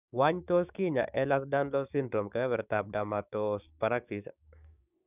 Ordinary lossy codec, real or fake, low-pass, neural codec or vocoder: none; fake; 3.6 kHz; codec, 16 kHz, 6 kbps, DAC